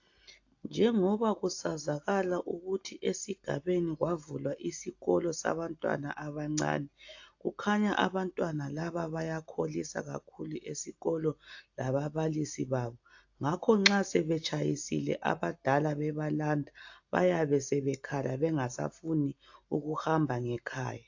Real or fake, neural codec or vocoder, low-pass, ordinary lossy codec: real; none; 7.2 kHz; AAC, 48 kbps